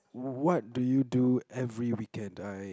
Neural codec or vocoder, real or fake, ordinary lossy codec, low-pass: none; real; none; none